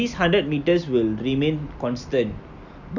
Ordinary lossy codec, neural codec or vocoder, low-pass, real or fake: none; none; 7.2 kHz; real